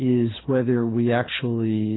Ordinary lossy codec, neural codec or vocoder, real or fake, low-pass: AAC, 16 kbps; codec, 24 kHz, 6 kbps, HILCodec; fake; 7.2 kHz